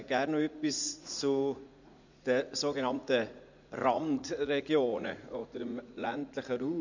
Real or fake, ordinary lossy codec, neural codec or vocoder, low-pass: fake; none; vocoder, 44.1 kHz, 80 mel bands, Vocos; 7.2 kHz